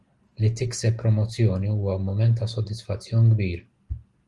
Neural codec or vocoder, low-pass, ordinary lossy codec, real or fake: none; 10.8 kHz; Opus, 24 kbps; real